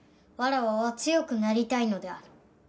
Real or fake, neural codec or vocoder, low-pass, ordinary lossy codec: real; none; none; none